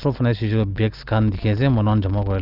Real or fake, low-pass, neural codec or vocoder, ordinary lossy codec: real; 5.4 kHz; none; Opus, 24 kbps